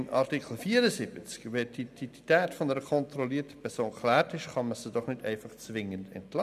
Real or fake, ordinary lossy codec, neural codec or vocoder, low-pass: real; none; none; 14.4 kHz